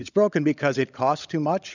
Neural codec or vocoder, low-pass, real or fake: codec, 16 kHz, 16 kbps, FunCodec, trained on LibriTTS, 50 frames a second; 7.2 kHz; fake